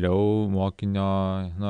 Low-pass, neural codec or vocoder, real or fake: 9.9 kHz; none; real